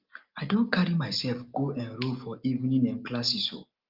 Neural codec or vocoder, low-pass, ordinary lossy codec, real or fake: none; 5.4 kHz; Opus, 24 kbps; real